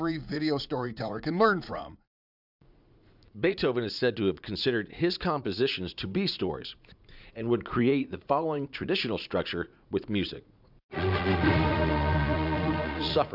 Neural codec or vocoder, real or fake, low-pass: vocoder, 44.1 kHz, 80 mel bands, Vocos; fake; 5.4 kHz